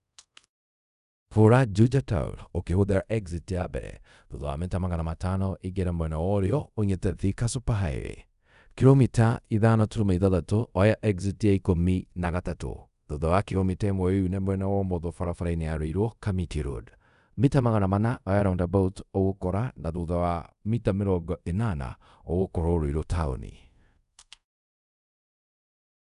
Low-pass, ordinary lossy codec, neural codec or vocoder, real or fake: 10.8 kHz; none; codec, 24 kHz, 0.5 kbps, DualCodec; fake